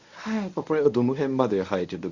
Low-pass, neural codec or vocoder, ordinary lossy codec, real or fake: 7.2 kHz; codec, 24 kHz, 0.9 kbps, WavTokenizer, medium speech release version 1; Opus, 64 kbps; fake